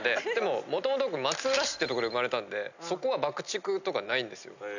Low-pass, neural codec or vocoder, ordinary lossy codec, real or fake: 7.2 kHz; none; none; real